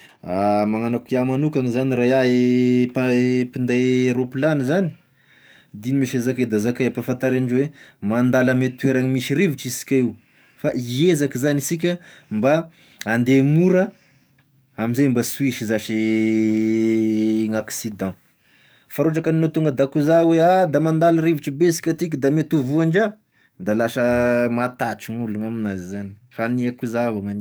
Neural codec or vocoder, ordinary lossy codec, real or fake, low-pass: codec, 44.1 kHz, 7.8 kbps, DAC; none; fake; none